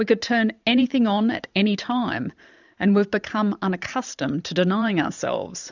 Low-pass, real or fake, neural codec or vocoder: 7.2 kHz; fake; vocoder, 44.1 kHz, 128 mel bands every 512 samples, BigVGAN v2